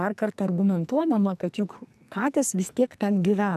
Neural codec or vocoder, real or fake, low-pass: codec, 44.1 kHz, 2.6 kbps, SNAC; fake; 14.4 kHz